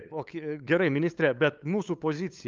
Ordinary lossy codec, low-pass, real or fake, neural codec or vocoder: Opus, 24 kbps; 7.2 kHz; fake; codec, 16 kHz, 8 kbps, FunCodec, trained on LibriTTS, 25 frames a second